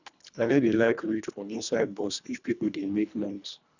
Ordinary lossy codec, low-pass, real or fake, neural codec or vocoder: none; 7.2 kHz; fake; codec, 24 kHz, 1.5 kbps, HILCodec